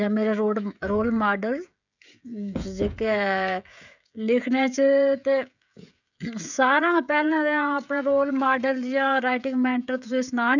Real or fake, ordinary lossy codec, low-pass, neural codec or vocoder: fake; none; 7.2 kHz; codec, 16 kHz, 16 kbps, FreqCodec, smaller model